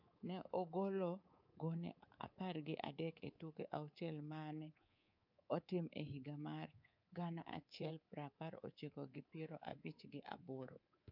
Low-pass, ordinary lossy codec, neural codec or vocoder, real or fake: 5.4 kHz; none; codec, 24 kHz, 3.1 kbps, DualCodec; fake